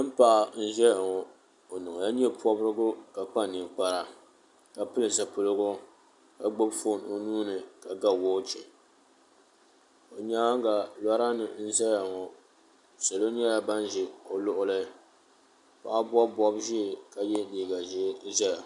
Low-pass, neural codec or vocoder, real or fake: 10.8 kHz; none; real